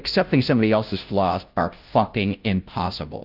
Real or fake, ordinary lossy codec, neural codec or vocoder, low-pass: fake; Opus, 32 kbps; codec, 16 kHz, 0.5 kbps, FunCodec, trained on Chinese and English, 25 frames a second; 5.4 kHz